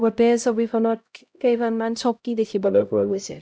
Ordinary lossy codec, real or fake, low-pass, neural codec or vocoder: none; fake; none; codec, 16 kHz, 0.5 kbps, X-Codec, HuBERT features, trained on LibriSpeech